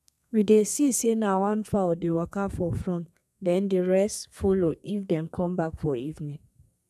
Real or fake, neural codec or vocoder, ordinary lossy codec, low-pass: fake; codec, 32 kHz, 1.9 kbps, SNAC; none; 14.4 kHz